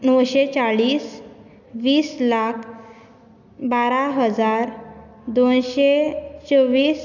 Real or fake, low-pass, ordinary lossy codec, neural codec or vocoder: real; 7.2 kHz; none; none